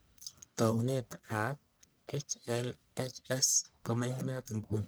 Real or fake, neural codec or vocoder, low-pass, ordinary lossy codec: fake; codec, 44.1 kHz, 1.7 kbps, Pupu-Codec; none; none